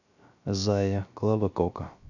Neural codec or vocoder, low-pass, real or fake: codec, 16 kHz, 0.3 kbps, FocalCodec; 7.2 kHz; fake